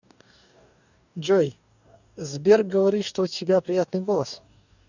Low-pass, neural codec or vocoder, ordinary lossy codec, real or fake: 7.2 kHz; codec, 44.1 kHz, 2.6 kbps, DAC; none; fake